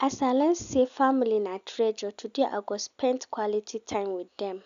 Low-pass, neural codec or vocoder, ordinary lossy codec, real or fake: 7.2 kHz; none; AAC, 96 kbps; real